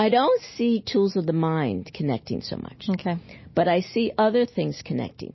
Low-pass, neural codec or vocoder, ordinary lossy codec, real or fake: 7.2 kHz; none; MP3, 24 kbps; real